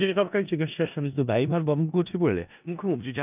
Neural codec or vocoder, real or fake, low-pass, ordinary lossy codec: codec, 16 kHz in and 24 kHz out, 0.4 kbps, LongCat-Audio-Codec, four codebook decoder; fake; 3.6 kHz; none